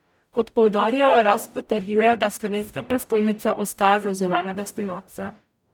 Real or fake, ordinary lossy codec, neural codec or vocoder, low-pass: fake; none; codec, 44.1 kHz, 0.9 kbps, DAC; 19.8 kHz